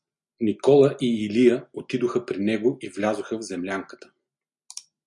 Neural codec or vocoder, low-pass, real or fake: none; 10.8 kHz; real